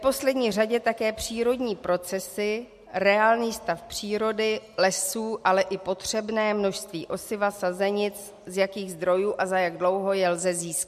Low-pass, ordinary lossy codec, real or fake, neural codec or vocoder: 14.4 kHz; MP3, 64 kbps; real; none